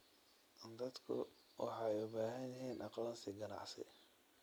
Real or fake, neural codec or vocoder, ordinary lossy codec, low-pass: real; none; none; none